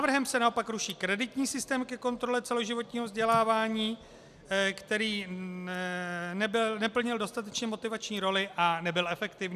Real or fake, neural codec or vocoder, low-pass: real; none; 14.4 kHz